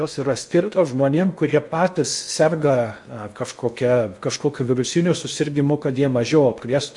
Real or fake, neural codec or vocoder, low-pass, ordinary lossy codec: fake; codec, 16 kHz in and 24 kHz out, 0.6 kbps, FocalCodec, streaming, 2048 codes; 10.8 kHz; MP3, 64 kbps